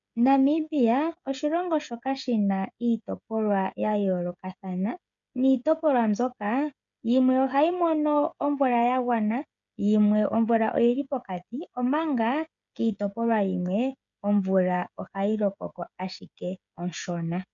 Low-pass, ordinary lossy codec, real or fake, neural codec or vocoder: 7.2 kHz; MP3, 96 kbps; fake; codec, 16 kHz, 16 kbps, FreqCodec, smaller model